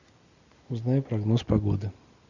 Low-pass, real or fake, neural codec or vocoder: 7.2 kHz; real; none